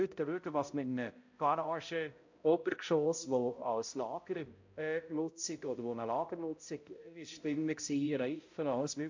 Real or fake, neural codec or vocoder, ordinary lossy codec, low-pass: fake; codec, 16 kHz, 0.5 kbps, X-Codec, HuBERT features, trained on balanced general audio; MP3, 48 kbps; 7.2 kHz